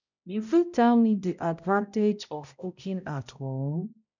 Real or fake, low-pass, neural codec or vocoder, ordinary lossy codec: fake; 7.2 kHz; codec, 16 kHz, 0.5 kbps, X-Codec, HuBERT features, trained on balanced general audio; none